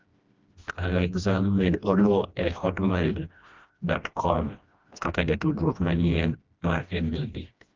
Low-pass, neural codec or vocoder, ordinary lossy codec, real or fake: 7.2 kHz; codec, 16 kHz, 1 kbps, FreqCodec, smaller model; Opus, 24 kbps; fake